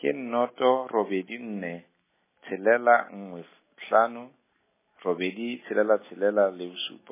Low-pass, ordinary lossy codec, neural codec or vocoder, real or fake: 3.6 kHz; MP3, 16 kbps; none; real